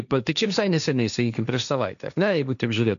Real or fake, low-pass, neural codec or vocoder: fake; 7.2 kHz; codec, 16 kHz, 1.1 kbps, Voila-Tokenizer